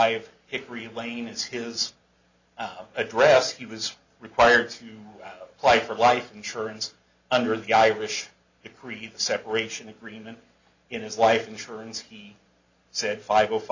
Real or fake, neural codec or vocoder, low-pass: real; none; 7.2 kHz